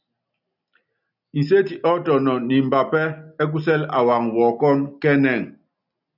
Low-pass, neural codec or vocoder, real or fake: 5.4 kHz; none; real